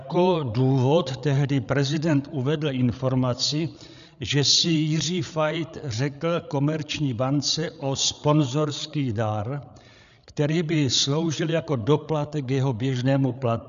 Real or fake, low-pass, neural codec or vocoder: fake; 7.2 kHz; codec, 16 kHz, 8 kbps, FreqCodec, larger model